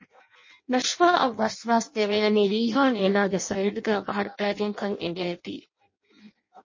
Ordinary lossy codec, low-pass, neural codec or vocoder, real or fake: MP3, 32 kbps; 7.2 kHz; codec, 16 kHz in and 24 kHz out, 0.6 kbps, FireRedTTS-2 codec; fake